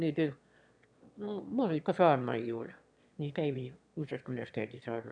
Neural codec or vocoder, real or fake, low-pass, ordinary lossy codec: autoencoder, 22.05 kHz, a latent of 192 numbers a frame, VITS, trained on one speaker; fake; 9.9 kHz; none